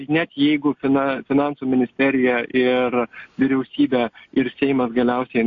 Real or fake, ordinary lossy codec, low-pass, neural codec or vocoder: real; AAC, 48 kbps; 7.2 kHz; none